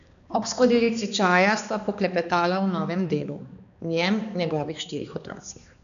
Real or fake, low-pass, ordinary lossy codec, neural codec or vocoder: fake; 7.2 kHz; none; codec, 16 kHz, 2 kbps, X-Codec, HuBERT features, trained on balanced general audio